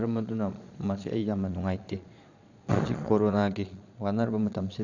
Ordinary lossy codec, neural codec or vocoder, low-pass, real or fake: none; vocoder, 22.05 kHz, 80 mel bands, Vocos; 7.2 kHz; fake